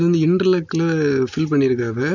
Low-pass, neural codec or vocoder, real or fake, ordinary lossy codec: 7.2 kHz; none; real; none